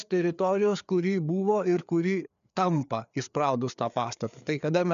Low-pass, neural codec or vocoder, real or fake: 7.2 kHz; codec, 16 kHz, 4 kbps, FreqCodec, larger model; fake